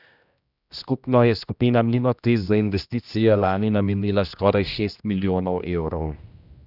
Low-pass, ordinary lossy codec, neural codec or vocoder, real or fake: 5.4 kHz; Opus, 64 kbps; codec, 16 kHz, 1 kbps, X-Codec, HuBERT features, trained on general audio; fake